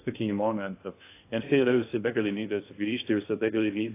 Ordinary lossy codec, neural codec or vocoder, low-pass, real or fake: AAC, 24 kbps; codec, 16 kHz in and 24 kHz out, 0.6 kbps, FocalCodec, streaming, 2048 codes; 3.6 kHz; fake